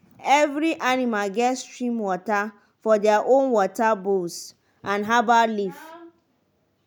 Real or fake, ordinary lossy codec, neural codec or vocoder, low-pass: real; none; none; none